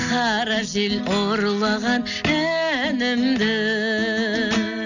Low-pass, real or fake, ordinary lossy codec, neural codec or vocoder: 7.2 kHz; real; none; none